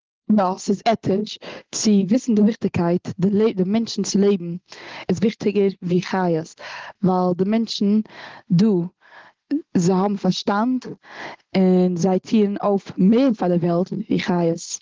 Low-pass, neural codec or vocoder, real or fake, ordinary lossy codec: 7.2 kHz; none; real; Opus, 16 kbps